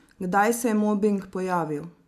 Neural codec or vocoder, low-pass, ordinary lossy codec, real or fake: none; 14.4 kHz; none; real